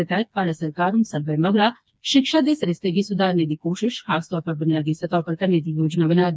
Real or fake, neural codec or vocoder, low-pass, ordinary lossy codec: fake; codec, 16 kHz, 2 kbps, FreqCodec, smaller model; none; none